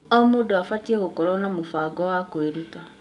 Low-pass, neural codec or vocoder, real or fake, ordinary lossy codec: 10.8 kHz; codec, 44.1 kHz, 7.8 kbps, DAC; fake; none